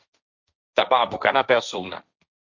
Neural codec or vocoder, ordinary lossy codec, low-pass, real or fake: codec, 16 kHz, 1.1 kbps, Voila-Tokenizer; Opus, 64 kbps; 7.2 kHz; fake